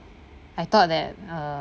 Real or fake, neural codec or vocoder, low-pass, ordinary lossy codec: real; none; none; none